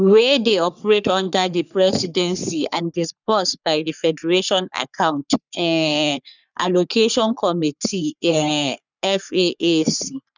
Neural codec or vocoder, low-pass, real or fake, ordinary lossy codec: codec, 44.1 kHz, 3.4 kbps, Pupu-Codec; 7.2 kHz; fake; none